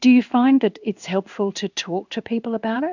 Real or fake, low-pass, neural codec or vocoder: fake; 7.2 kHz; codec, 16 kHz in and 24 kHz out, 1 kbps, XY-Tokenizer